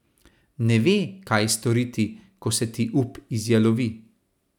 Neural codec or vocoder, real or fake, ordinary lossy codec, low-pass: none; real; none; 19.8 kHz